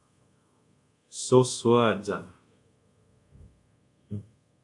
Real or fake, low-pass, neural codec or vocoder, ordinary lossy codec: fake; 10.8 kHz; codec, 24 kHz, 0.5 kbps, DualCodec; AAC, 64 kbps